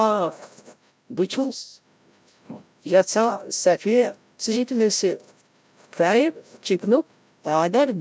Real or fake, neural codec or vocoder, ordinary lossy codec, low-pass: fake; codec, 16 kHz, 0.5 kbps, FreqCodec, larger model; none; none